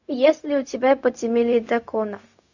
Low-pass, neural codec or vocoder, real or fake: 7.2 kHz; codec, 16 kHz, 0.4 kbps, LongCat-Audio-Codec; fake